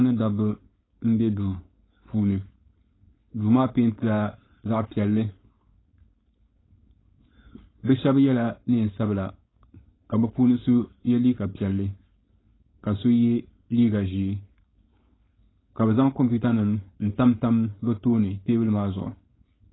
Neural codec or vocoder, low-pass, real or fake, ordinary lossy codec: codec, 16 kHz, 4.8 kbps, FACodec; 7.2 kHz; fake; AAC, 16 kbps